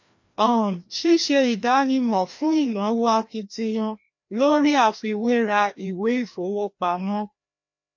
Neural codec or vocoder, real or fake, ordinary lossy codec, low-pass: codec, 16 kHz, 1 kbps, FreqCodec, larger model; fake; MP3, 48 kbps; 7.2 kHz